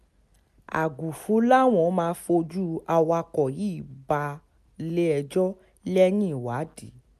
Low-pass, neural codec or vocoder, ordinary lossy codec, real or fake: 14.4 kHz; none; MP3, 96 kbps; real